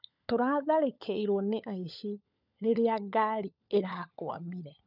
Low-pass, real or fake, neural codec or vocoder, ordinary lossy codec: 5.4 kHz; fake; codec, 16 kHz, 16 kbps, FunCodec, trained on LibriTTS, 50 frames a second; none